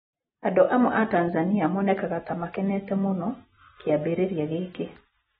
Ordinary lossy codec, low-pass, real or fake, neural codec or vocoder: AAC, 16 kbps; 19.8 kHz; real; none